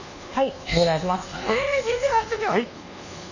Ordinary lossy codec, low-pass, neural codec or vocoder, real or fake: none; 7.2 kHz; codec, 24 kHz, 1.2 kbps, DualCodec; fake